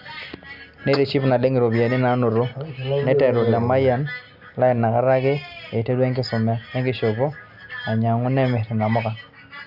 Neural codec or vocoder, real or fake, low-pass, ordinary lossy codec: none; real; 5.4 kHz; none